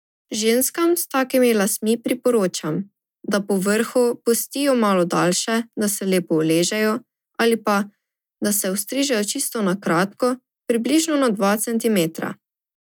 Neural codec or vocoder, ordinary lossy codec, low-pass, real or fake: none; none; 19.8 kHz; real